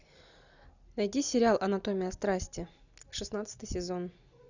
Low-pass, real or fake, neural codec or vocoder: 7.2 kHz; real; none